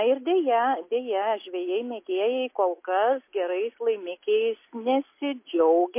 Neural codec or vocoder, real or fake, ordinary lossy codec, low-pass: none; real; MP3, 24 kbps; 3.6 kHz